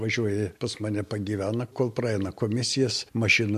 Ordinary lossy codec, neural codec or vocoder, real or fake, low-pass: MP3, 64 kbps; none; real; 14.4 kHz